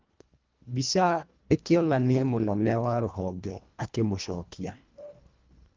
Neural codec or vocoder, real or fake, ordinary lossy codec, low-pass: codec, 24 kHz, 1.5 kbps, HILCodec; fake; Opus, 32 kbps; 7.2 kHz